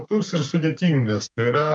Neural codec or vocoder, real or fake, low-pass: codec, 32 kHz, 1.9 kbps, SNAC; fake; 9.9 kHz